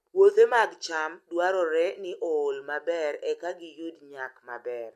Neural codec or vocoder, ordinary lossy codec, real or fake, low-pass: none; MP3, 64 kbps; real; 14.4 kHz